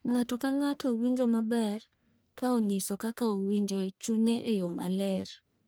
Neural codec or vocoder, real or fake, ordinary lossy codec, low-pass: codec, 44.1 kHz, 1.7 kbps, Pupu-Codec; fake; none; none